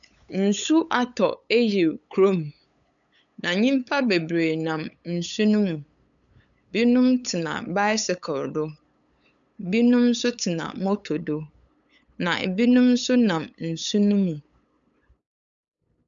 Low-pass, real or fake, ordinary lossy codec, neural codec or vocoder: 7.2 kHz; fake; MP3, 96 kbps; codec, 16 kHz, 8 kbps, FunCodec, trained on LibriTTS, 25 frames a second